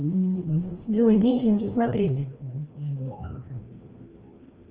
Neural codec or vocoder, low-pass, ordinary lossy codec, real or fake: codec, 16 kHz, 1 kbps, FreqCodec, larger model; 3.6 kHz; Opus, 16 kbps; fake